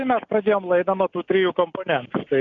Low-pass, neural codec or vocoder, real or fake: 7.2 kHz; none; real